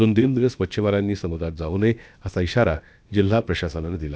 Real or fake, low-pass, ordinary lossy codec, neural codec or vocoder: fake; none; none; codec, 16 kHz, about 1 kbps, DyCAST, with the encoder's durations